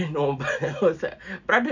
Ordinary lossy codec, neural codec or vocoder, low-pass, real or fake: none; none; 7.2 kHz; real